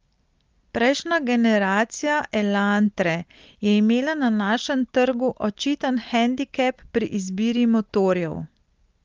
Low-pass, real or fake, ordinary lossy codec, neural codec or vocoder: 7.2 kHz; real; Opus, 32 kbps; none